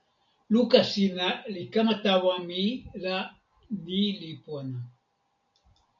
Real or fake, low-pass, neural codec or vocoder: real; 7.2 kHz; none